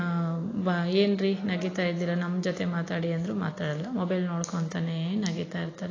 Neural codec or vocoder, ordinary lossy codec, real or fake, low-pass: none; AAC, 32 kbps; real; 7.2 kHz